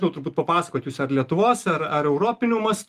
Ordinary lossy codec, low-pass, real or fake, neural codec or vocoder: Opus, 32 kbps; 14.4 kHz; real; none